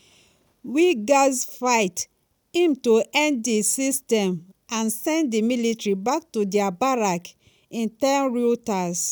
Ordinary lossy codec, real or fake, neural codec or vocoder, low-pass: none; real; none; none